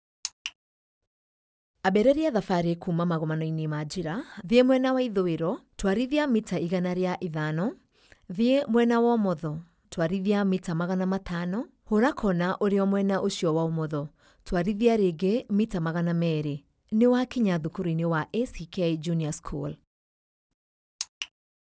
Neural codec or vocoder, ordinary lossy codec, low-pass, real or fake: none; none; none; real